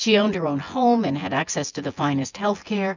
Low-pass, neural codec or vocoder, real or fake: 7.2 kHz; vocoder, 24 kHz, 100 mel bands, Vocos; fake